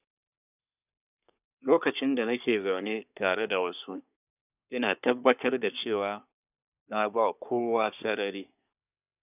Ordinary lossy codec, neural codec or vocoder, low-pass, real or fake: none; codec, 24 kHz, 1 kbps, SNAC; 3.6 kHz; fake